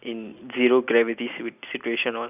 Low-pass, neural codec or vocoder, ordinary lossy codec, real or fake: 3.6 kHz; none; none; real